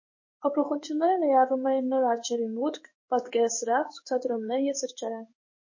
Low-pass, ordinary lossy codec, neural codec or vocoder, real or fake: 7.2 kHz; MP3, 32 kbps; codec, 16 kHz in and 24 kHz out, 1 kbps, XY-Tokenizer; fake